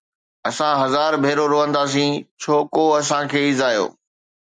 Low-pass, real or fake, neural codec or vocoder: 9.9 kHz; real; none